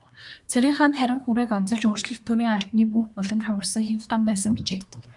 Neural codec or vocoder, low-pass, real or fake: codec, 24 kHz, 1 kbps, SNAC; 10.8 kHz; fake